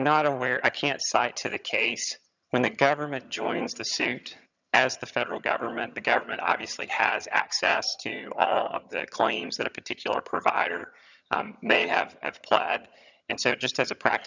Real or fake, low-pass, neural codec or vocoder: fake; 7.2 kHz; vocoder, 22.05 kHz, 80 mel bands, HiFi-GAN